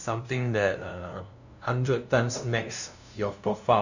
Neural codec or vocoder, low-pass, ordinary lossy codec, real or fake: codec, 16 kHz, 0.5 kbps, FunCodec, trained on LibriTTS, 25 frames a second; 7.2 kHz; none; fake